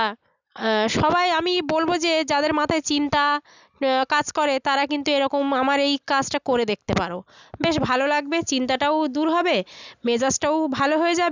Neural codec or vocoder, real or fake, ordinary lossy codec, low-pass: none; real; none; 7.2 kHz